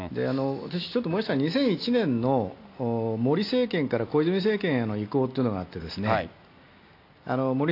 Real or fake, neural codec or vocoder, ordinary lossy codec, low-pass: real; none; AAC, 32 kbps; 5.4 kHz